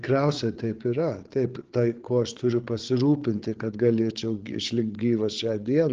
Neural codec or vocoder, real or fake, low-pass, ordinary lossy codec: codec, 16 kHz, 8 kbps, FreqCodec, larger model; fake; 7.2 kHz; Opus, 16 kbps